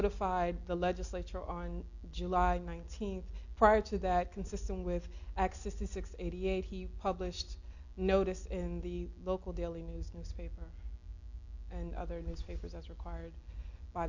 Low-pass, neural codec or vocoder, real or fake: 7.2 kHz; none; real